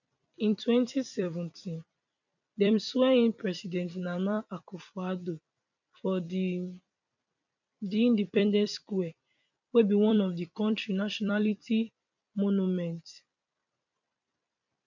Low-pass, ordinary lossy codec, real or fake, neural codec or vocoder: 7.2 kHz; none; fake; vocoder, 44.1 kHz, 128 mel bands every 256 samples, BigVGAN v2